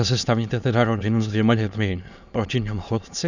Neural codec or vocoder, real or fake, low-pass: autoencoder, 22.05 kHz, a latent of 192 numbers a frame, VITS, trained on many speakers; fake; 7.2 kHz